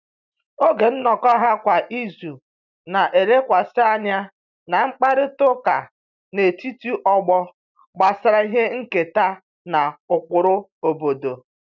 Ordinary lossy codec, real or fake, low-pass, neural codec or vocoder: none; real; 7.2 kHz; none